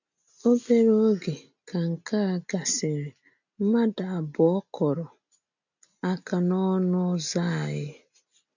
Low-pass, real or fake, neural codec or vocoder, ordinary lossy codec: 7.2 kHz; real; none; none